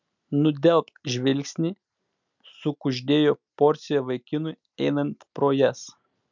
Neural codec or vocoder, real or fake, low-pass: none; real; 7.2 kHz